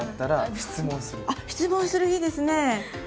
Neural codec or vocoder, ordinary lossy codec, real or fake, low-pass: none; none; real; none